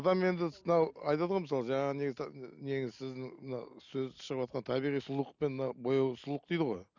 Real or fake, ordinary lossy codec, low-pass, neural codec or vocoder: real; none; 7.2 kHz; none